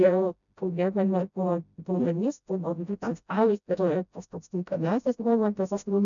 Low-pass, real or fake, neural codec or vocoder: 7.2 kHz; fake; codec, 16 kHz, 0.5 kbps, FreqCodec, smaller model